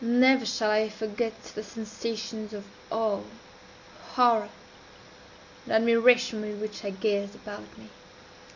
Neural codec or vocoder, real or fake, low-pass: none; real; 7.2 kHz